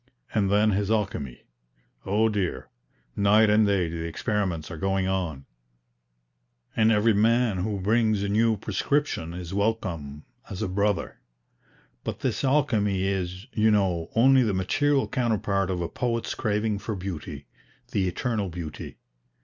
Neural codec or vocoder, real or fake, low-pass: none; real; 7.2 kHz